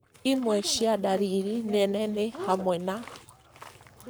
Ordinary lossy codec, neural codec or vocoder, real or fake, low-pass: none; codec, 44.1 kHz, 7.8 kbps, Pupu-Codec; fake; none